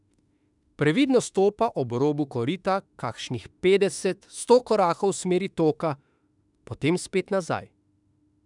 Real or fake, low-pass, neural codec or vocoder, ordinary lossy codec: fake; 10.8 kHz; autoencoder, 48 kHz, 32 numbers a frame, DAC-VAE, trained on Japanese speech; none